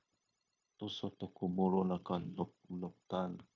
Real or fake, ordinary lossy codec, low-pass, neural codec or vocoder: fake; MP3, 48 kbps; 7.2 kHz; codec, 16 kHz, 0.9 kbps, LongCat-Audio-Codec